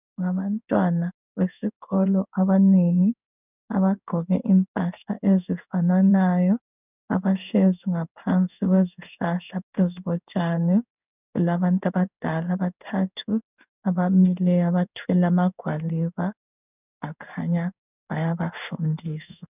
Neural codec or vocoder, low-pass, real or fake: codec, 16 kHz in and 24 kHz out, 1 kbps, XY-Tokenizer; 3.6 kHz; fake